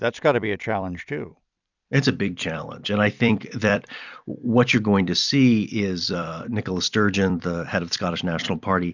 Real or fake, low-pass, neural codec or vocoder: fake; 7.2 kHz; vocoder, 44.1 kHz, 128 mel bands every 256 samples, BigVGAN v2